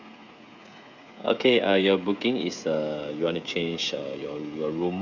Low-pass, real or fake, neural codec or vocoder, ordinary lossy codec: 7.2 kHz; fake; codec, 16 kHz, 16 kbps, FreqCodec, smaller model; none